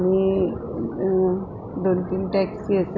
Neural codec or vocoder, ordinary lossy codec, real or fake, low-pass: none; none; real; 7.2 kHz